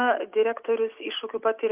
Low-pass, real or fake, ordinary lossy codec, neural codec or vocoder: 3.6 kHz; real; Opus, 24 kbps; none